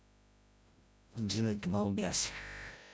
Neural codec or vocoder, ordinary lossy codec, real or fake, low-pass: codec, 16 kHz, 0.5 kbps, FreqCodec, larger model; none; fake; none